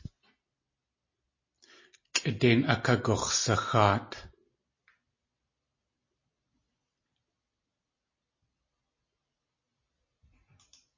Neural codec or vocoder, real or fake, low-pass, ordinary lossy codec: none; real; 7.2 kHz; MP3, 32 kbps